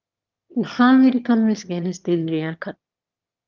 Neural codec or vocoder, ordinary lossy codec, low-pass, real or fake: autoencoder, 22.05 kHz, a latent of 192 numbers a frame, VITS, trained on one speaker; Opus, 32 kbps; 7.2 kHz; fake